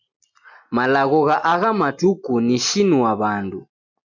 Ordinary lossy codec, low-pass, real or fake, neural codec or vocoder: MP3, 64 kbps; 7.2 kHz; real; none